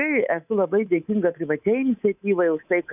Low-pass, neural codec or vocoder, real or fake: 3.6 kHz; codec, 24 kHz, 3.1 kbps, DualCodec; fake